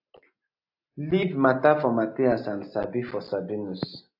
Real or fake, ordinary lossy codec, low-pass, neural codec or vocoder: real; AAC, 48 kbps; 5.4 kHz; none